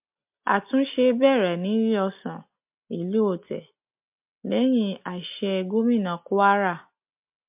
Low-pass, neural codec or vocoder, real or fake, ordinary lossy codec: 3.6 kHz; none; real; MP3, 32 kbps